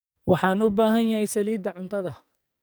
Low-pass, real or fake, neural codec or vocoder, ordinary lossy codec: none; fake; codec, 44.1 kHz, 2.6 kbps, SNAC; none